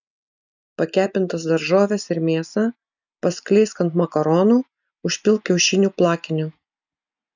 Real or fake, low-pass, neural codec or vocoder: real; 7.2 kHz; none